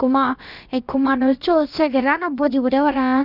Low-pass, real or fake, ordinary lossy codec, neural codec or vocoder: 5.4 kHz; fake; none; codec, 16 kHz, about 1 kbps, DyCAST, with the encoder's durations